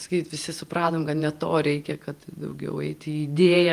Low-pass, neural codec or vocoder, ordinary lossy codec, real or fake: 14.4 kHz; vocoder, 48 kHz, 128 mel bands, Vocos; Opus, 32 kbps; fake